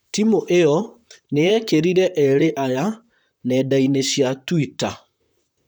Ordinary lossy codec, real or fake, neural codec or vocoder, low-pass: none; fake; vocoder, 44.1 kHz, 128 mel bands, Pupu-Vocoder; none